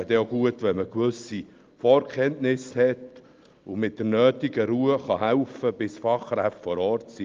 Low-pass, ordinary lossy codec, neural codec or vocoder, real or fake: 7.2 kHz; Opus, 32 kbps; none; real